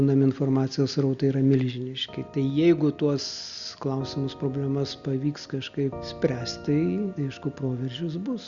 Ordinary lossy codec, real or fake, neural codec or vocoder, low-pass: Opus, 64 kbps; real; none; 7.2 kHz